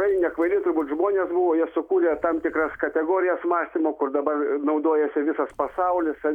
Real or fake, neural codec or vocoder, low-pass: real; none; 19.8 kHz